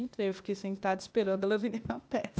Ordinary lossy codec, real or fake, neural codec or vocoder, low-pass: none; fake; codec, 16 kHz, 0.8 kbps, ZipCodec; none